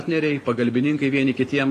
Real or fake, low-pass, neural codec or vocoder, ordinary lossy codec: fake; 14.4 kHz; vocoder, 44.1 kHz, 128 mel bands every 512 samples, BigVGAN v2; AAC, 48 kbps